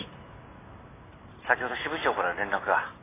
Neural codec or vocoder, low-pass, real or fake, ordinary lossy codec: none; 3.6 kHz; real; AAC, 16 kbps